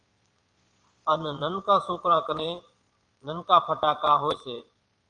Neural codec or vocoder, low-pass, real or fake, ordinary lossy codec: vocoder, 22.05 kHz, 80 mel bands, Vocos; 9.9 kHz; fake; Opus, 32 kbps